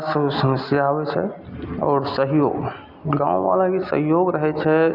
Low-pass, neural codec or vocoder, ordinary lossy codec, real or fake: 5.4 kHz; none; Opus, 64 kbps; real